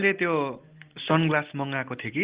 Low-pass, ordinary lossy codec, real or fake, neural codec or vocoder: 3.6 kHz; Opus, 16 kbps; real; none